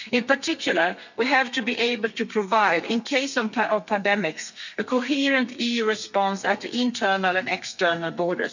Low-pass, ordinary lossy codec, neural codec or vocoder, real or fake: 7.2 kHz; none; codec, 32 kHz, 1.9 kbps, SNAC; fake